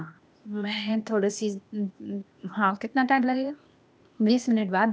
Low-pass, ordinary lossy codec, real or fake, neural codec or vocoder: none; none; fake; codec, 16 kHz, 0.8 kbps, ZipCodec